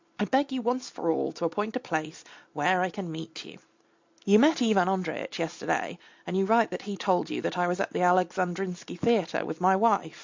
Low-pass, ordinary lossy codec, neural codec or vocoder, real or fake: 7.2 kHz; MP3, 64 kbps; none; real